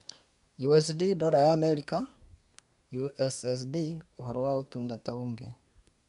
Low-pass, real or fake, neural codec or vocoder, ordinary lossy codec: 10.8 kHz; fake; codec, 24 kHz, 1 kbps, SNAC; none